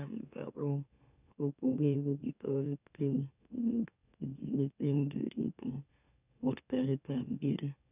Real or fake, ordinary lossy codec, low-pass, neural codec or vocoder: fake; none; 3.6 kHz; autoencoder, 44.1 kHz, a latent of 192 numbers a frame, MeloTTS